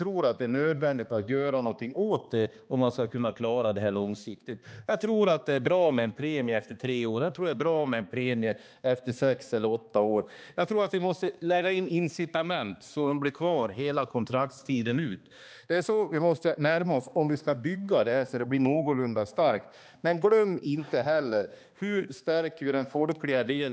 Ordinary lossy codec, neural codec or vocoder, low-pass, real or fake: none; codec, 16 kHz, 2 kbps, X-Codec, HuBERT features, trained on balanced general audio; none; fake